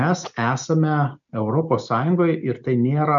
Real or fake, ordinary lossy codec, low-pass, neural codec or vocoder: real; MP3, 96 kbps; 7.2 kHz; none